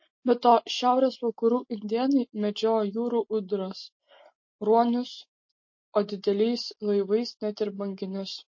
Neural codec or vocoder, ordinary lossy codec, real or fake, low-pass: none; MP3, 32 kbps; real; 7.2 kHz